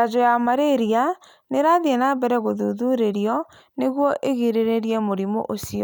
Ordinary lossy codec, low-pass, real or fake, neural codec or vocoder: none; none; real; none